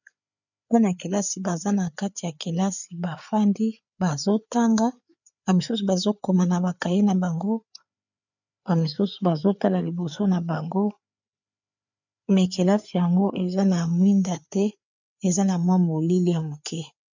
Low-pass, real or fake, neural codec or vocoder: 7.2 kHz; fake; codec, 16 kHz, 4 kbps, FreqCodec, larger model